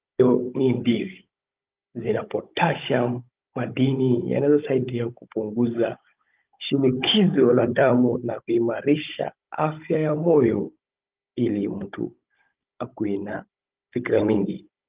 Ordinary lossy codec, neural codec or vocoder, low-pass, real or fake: Opus, 32 kbps; codec, 16 kHz, 16 kbps, FunCodec, trained on Chinese and English, 50 frames a second; 3.6 kHz; fake